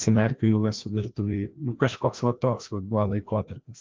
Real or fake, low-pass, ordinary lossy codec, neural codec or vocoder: fake; 7.2 kHz; Opus, 24 kbps; codec, 16 kHz, 1 kbps, FreqCodec, larger model